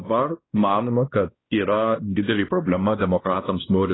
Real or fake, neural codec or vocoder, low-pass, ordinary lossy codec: fake; codec, 16 kHz, 1 kbps, X-Codec, WavLM features, trained on Multilingual LibriSpeech; 7.2 kHz; AAC, 16 kbps